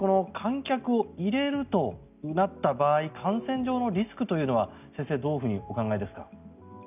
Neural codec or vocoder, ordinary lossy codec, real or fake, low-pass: none; none; real; 3.6 kHz